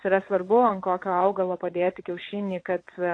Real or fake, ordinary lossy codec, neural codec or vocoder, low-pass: fake; AAC, 48 kbps; vocoder, 22.05 kHz, 80 mel bands, WaveNeXt; 9.9 kHz